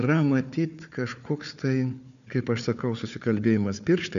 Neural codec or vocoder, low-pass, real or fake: codec, 16 kHz, 4 kbps, FunCodec, trained on Chinese and English, 50 frames a second; 7.2 kHz; fake